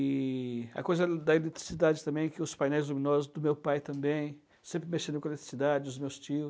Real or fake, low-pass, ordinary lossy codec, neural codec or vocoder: real; none; none; none